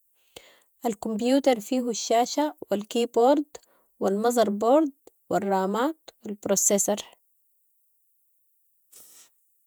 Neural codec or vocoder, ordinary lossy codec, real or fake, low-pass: vocoder, 48 kHz, 128 mel bands, Vocos; none; fake; none